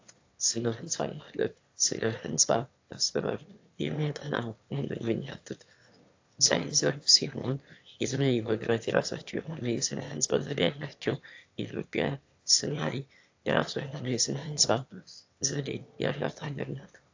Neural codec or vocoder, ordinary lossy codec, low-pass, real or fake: autoencoder, 22.05 kHz, a latent of 192 numbers a frame, VITS, trained on one speaker; AAC, 48 kbps; 7.2 kHz; fake